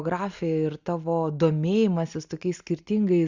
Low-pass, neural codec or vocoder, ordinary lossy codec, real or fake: 7.2 kHz; none; Opus, 64 kbps; real